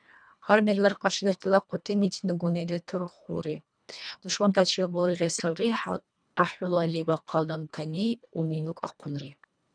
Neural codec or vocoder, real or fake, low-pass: codec, 24 kHz, 1.5 kbps, HILCodec; fake; 9.9 kHz